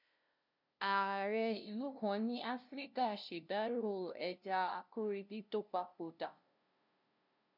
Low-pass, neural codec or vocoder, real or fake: 5.4 kHz; codec, 16 kHz, 0.5 kbps, FunCodec, trained on LibriTTS, 25 frames a second; fake